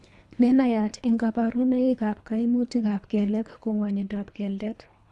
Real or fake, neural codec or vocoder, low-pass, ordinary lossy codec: fake; codec, 24 kHz, 3 kbps, HILCodec; none; none